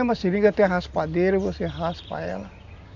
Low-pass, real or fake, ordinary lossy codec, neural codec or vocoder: 7.2 kHz; real; none; none